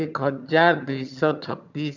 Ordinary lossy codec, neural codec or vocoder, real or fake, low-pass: none; vocoder, 22.05 kHz, 80 mel bands, HiFi-GAN; fake; 7.2 kHz